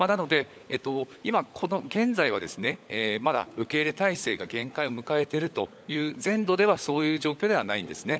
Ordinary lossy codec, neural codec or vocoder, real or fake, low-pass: none; codec, 16 kHz, 16 kbps, FunCodec, trained on LibriTTS, 50 frames a second; fake; none